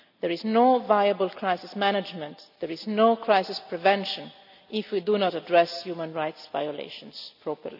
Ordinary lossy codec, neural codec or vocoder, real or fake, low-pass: none; none; real; 5.4 kHz